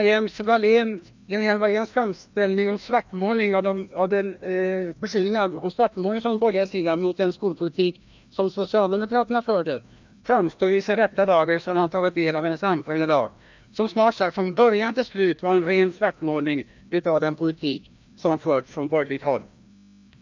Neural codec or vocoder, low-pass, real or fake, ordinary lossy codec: codec, 16 kHz, 1 kbps, FreqCodec, larger model; 7.2 kHz; fake; MP3, 64 kbps